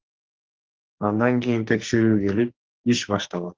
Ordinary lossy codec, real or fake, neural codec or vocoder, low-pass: Opus, 16 kbps; fake; codec, 44.1 kHz, 2.6 kbps, SNAC; 7.2 kHz